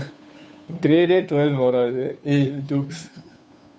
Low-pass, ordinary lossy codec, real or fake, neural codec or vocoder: none; none; fake; codec, 16 kHz, 2 kbps, FunCodec, trained on Chinese and English, 25 frames a second